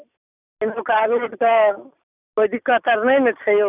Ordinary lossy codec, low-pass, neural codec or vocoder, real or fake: none; 3.6 kHz; none; real